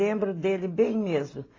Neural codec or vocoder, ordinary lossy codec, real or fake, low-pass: none; AAC, 32 kbps; real; 7.2 kHz